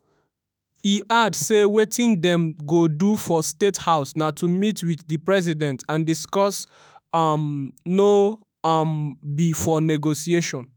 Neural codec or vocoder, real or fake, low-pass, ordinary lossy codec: autoencoder, 48 kHz, 32 numbers a frame, DAC-VAE, trained on Japanese speech; fake; none; none